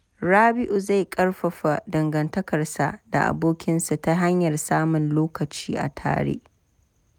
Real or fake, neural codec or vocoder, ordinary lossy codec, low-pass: real; none; none; none